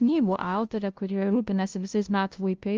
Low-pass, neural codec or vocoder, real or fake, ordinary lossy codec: 7.2 kHz; codec, 16 kHz, 0.5 kbps, FunCodec, trained on LibriTTS, 25 frames a second; fake; Opus, 24 kbps